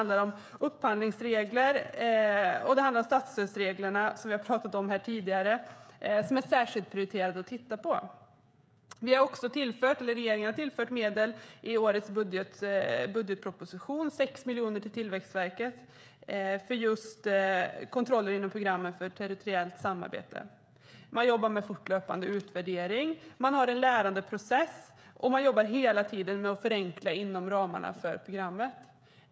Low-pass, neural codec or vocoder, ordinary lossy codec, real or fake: none; codec, 16 kHz, 16 kbps, FreqCodec, smaller model; none; fake